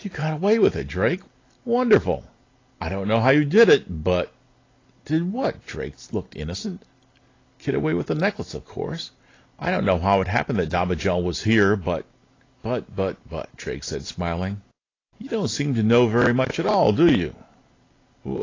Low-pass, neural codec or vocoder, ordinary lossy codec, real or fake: 7.2 kHz; none; AAC, 32 kbps; real